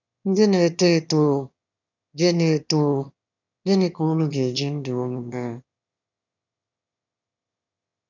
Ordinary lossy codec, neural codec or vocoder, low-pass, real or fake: none; autoencoder, 22.05 kHz, a latent of 192 numbers a frame, VITS, trained on one speaker; 7.2 kHz; fake